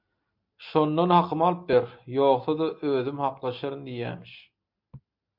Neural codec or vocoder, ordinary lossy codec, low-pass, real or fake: none; MP3, 48 kbps; 5.4 kHz; real